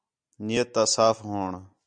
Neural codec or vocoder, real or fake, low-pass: none; real; 9.9 kHz